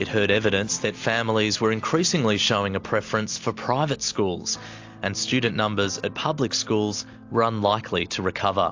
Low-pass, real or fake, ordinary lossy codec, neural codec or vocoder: 7.2 kHz; real; AAC, 48 kbps; none